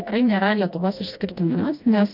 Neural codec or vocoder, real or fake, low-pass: codec, 16 kHz, 1 kbps, FreqCodec, smaller model; fake; 5.4 kHz